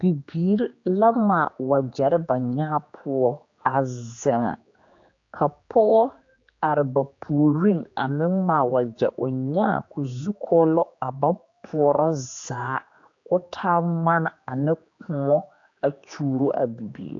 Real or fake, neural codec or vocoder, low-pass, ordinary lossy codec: fake; codec, 16 kHz, 2 kbps, X-Codec, HuBERT features, trained on general audio; 7.2 kHz; AAC, 48 kbps